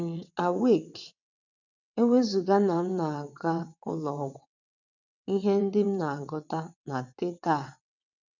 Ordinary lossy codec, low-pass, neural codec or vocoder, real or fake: none; 7.2 kHz; vocoder, 22.05 kHz, 80 mel bands, WaveNeXt; fake